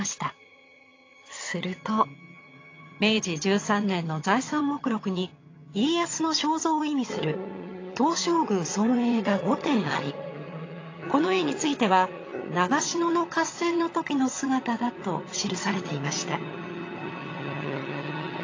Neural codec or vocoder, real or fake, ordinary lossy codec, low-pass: vocoder, 22.05 kHz, 80 mel bands, HiFi-GAN; fake; AAC, 32 kbps; 7.2 kHz